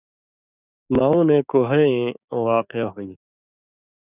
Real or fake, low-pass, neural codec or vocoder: fake; 3.6 kHz; codec, 16 kHz, 6 kbps, DAC